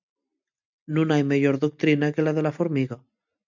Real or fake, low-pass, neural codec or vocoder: real; 7.2 kHz; none